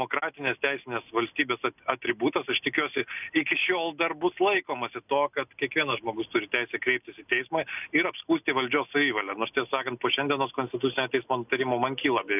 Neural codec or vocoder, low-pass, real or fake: none; 3.6 kHz; real